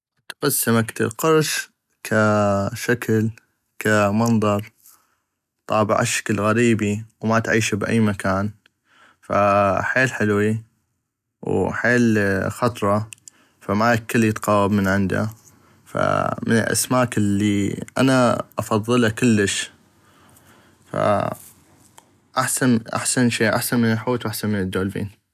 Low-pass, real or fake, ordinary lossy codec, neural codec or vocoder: 14.4 kHz; real; none; none